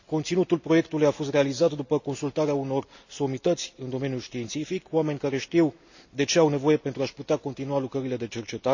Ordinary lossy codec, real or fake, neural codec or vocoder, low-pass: none; real; none; 7.2 kHz